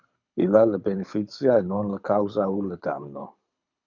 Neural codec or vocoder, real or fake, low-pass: codec, 24 kHz, 6 kbps, HILCodec; fake; 7.2 kHz